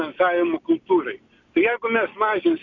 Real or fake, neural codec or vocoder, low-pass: real; none; 7.2 kHz